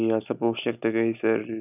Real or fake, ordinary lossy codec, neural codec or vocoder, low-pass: real; none; none; 3.6 kHz